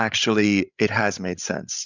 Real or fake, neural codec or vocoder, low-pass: fake; codec, 16 kHz, 16 kbps, FreqCodec, larger model; 7.2 kHz